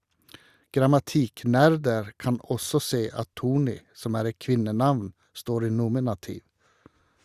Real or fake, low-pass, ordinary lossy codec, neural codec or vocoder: real; 14.4 kHz; Opus, 64 kbps; none